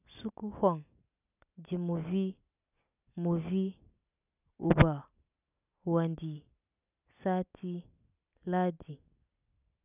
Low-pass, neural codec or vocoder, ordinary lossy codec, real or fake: 3.6 kHz; none; none; real